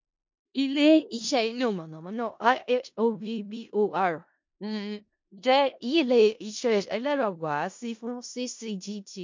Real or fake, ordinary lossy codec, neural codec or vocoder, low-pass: fake; MP3, 48 kbps; codec, 16 kHz in and 24 kHz out, 0.4 kbps, LongCat-Audio-Codec, four codebook decoder; 7.2 kHz